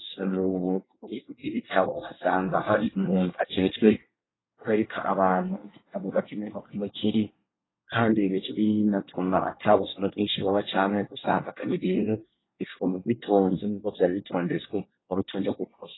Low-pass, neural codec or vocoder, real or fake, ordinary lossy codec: 7.2 kHz; codec, 24 kHz, 1 kbps, SNAC; fake; AAC, 16 kbps